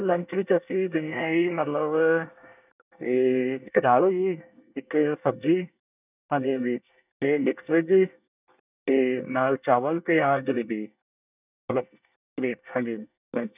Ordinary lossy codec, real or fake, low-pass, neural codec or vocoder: none; fake; 3.6 kHz; codec, 24 kHz, 1 kbps, SNAC